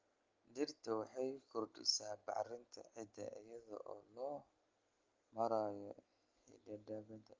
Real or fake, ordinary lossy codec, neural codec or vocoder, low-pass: real; Opus, 24 kbps; none; 7.2 kHz